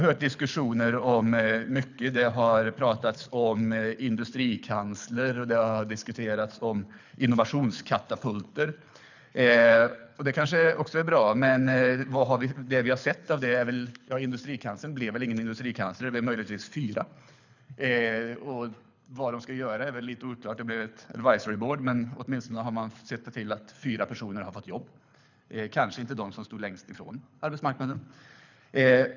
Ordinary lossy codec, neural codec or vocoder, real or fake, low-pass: none; codec, 24 kHz, 6 kbps, HILCodec; fake; 7.2 kHz